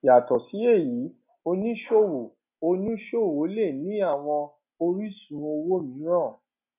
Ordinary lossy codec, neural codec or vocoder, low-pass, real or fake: none; none; 3.6 kHz; real